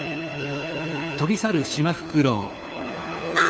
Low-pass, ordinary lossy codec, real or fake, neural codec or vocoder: none; none; fake; codec, 16 kHz, 4 kbps, FunCodec, trained on LibriTTS, 50 frames a second